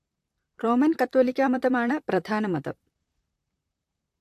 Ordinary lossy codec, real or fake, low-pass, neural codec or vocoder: AAC, 64 kbps; fake; 14.4 kHz; vocoder, 44.1 kHz, 128 mel bands, Pupu-Vocoder